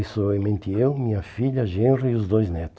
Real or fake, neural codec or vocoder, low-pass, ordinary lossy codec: real; none; none; none